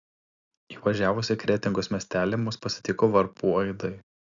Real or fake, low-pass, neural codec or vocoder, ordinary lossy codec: real; 7.2 kHz; none; Opus, 64 kbps